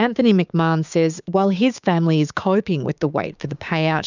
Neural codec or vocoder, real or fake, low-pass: codec, 16 kHz, 6 kbps, DAC; fake; 7.2 kHz